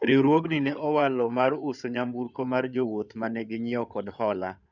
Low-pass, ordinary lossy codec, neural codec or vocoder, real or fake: 7.2 kHz; none; codec, 16 kHz in and 24 kHz out, 2.2 kbps, FireRedTTS-2 codec; fake